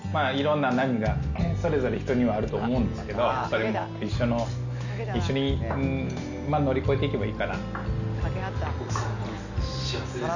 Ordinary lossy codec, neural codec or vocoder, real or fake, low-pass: none; none; real; 7.2 kHz